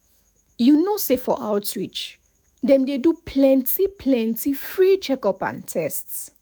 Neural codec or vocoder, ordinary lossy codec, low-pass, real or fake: autoencoder, 48 kHz, 128 numbers a frame, DAC-VAE, trained on Japanese speech; none; none; fake